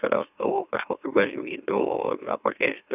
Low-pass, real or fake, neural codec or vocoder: 3.6 kHz; fake; autoencoder, 44.1 kHz, a latent of 192 numbers a frame, MeloTTS